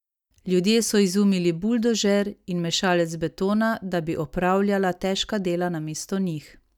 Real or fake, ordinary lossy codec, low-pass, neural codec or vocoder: real; none; 19.8 kHz; none